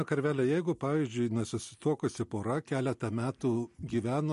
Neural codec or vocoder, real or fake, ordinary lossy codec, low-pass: none; real; MP3, 48 kbps; 14.4 kHz